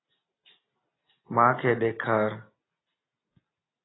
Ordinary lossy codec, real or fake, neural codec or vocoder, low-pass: AAC, 16 kbps; real; none; 7.2 kHz